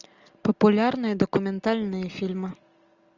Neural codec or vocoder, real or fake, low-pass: none; real; 7.2 kHz